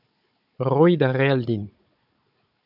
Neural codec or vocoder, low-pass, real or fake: codec, 16 kHz, 16 kbps, FunCodec, trained on Chinese and English, 50 frames a second; 5.4 kHz; fake